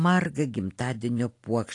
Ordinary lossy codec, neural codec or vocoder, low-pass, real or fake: AAC, 48 kbps; none; 10.8 kHz; real